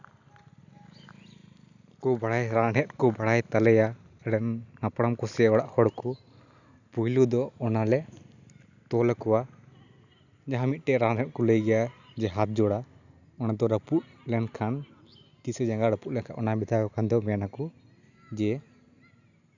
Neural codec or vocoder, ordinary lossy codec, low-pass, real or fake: none; none; 7.2 kHz; real